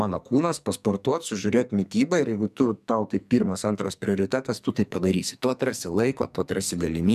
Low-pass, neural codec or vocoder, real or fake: 14.4 kHz; codec, 44.1 kHz, 2.6 kbps, SNAC; fake